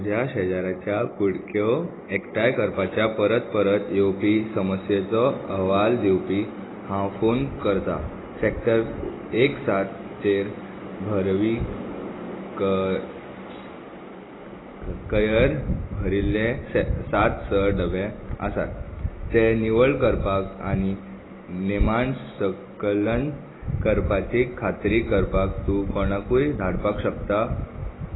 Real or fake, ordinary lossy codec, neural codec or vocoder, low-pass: real; AAC, 16 kbps; none; 7.2 kHz